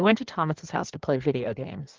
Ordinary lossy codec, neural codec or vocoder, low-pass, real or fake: Opus, 32 kbps; codec, 16 kHz in and 24 kHz out, 1.1 kbps, FireRedTTS-2 codec; 7.2 kHz; fake